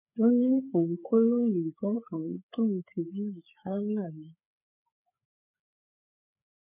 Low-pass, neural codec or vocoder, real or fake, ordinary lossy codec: 3.6 kHz; codec, 16 kHz, 4 kbps, FreqCodec, larger model; fake; none